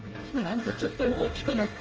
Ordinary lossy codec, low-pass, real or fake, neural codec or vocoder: Opus, 24 kbps; 7.2 kHz; fake; codec, 24 kHz, 1 kbps, SNAC